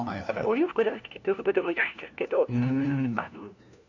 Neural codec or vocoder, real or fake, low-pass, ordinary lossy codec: codec, 16 kHz, 1 kbps, FunCodec, trained on LibriTTS, 50 frames a second; fake; 7.2 kHz; none